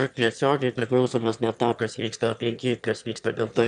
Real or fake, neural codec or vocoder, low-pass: fake; autoencoder, 22.05 kHz, a latent of 192 numbers a frame, VITS, trained on one speaker; 9.9 kHz